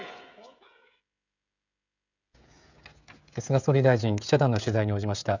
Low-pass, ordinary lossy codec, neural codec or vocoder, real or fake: 7.2 kHz; none; codec, 16 kHz, 16 kbps, FreqCodec, smaller model; fake